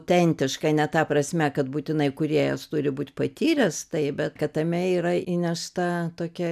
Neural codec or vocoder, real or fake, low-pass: none; real; 14.4 kHz